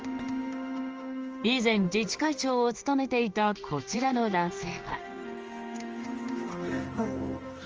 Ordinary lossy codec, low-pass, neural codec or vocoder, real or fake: Opus, 24 kbps; 7.2 kHz; codec, 16 kHz in and 24 kHz out, 1 kbps, XY-Tokenizer; fake